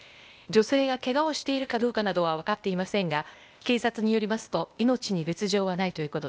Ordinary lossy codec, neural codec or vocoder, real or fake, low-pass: none; codec, 16 kHz, 0.8 kbps, ZipCodec; fake; none